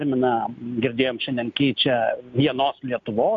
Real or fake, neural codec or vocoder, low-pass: real; none; 7.2 kHz